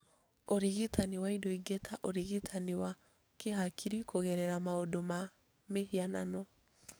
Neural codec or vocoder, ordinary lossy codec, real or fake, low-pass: codec, 44.1 kHz, 7.8 kbps, DAC; none; fake; none